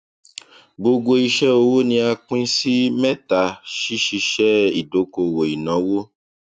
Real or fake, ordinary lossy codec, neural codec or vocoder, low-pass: real; none; none; 9.9 kHz